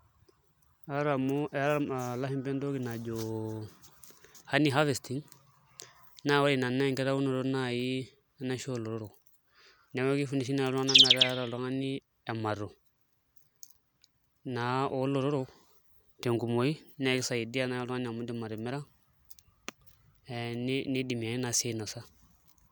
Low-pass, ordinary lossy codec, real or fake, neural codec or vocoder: none; none; real; none